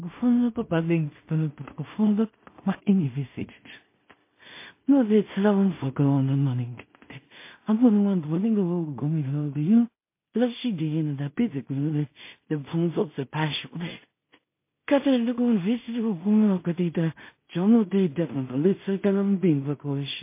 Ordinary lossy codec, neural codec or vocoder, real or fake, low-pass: MP3, 24 kbps; codec, 16 kHz in and 24 kHz out, 0.4 kbps, LongCat-Audio-Codec, two codebook decoder; fake; 3.6 kHz